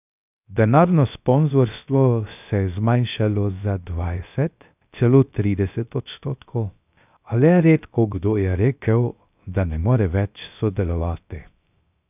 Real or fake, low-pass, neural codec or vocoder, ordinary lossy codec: fake; 3.6 kHz; codec, 16 kHz, 0.3 kbps, FocalCodec; none